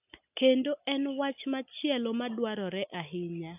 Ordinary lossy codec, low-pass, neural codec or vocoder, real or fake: AAC, 32 kbps; 3.6 kHz; none; real